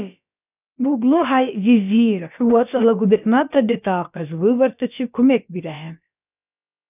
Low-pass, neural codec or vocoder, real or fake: 3.6 kHz; codec, 16 kHz, about 1 kbps, DyCAST, with the encoder's durations; fake